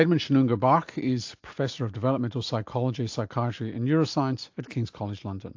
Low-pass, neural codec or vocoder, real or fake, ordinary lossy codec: 7.2 kHz; none; real; AAC, 48 kbps